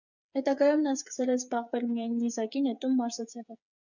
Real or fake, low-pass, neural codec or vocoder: fake; 7.2 kHz; codec, 16 kHz, 8 kbps, FreqCodec, smaller model